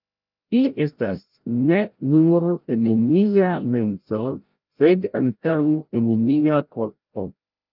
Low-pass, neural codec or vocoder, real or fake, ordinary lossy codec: 5.4 kHz; codec, 16 kHz, 0.5 kbps, FreqCodec, larger model; fake; Opus, 24 kbps